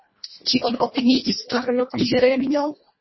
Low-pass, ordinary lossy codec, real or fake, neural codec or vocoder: 7.2 kHz; MP3, 24 kbps; fake; codec, 24 kHz, 1.5 kbps, HILCodec